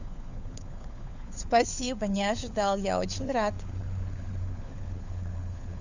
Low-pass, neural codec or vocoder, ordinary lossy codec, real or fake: 7.2 kHz; codec, 16 kHz, 4 kbps, FunCodec, trained on LibriTTS, 50 frames a second; none; fake